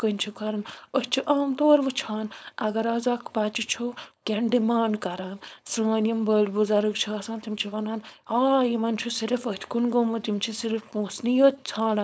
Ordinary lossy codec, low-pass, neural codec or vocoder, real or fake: none; none; codec, 16 kHz, 4.8 kbps, FACodec; fake